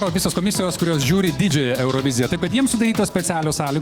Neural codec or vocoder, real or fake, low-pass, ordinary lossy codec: codec, 44.1 kHz, 7.8 kbps, DAC; fake; 19.8 kHz; Opus, 64 kbps